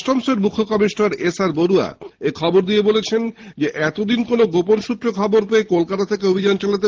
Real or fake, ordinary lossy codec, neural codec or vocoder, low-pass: real; Opus, 16 kbps; none; 7.2 kHz